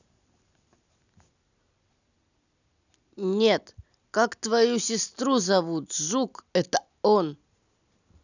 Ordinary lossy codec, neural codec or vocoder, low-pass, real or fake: none; none; 7.2 kHz; real